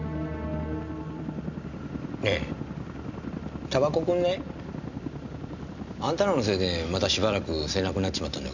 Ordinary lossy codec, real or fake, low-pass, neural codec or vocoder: none; real; 7.2 kHz; none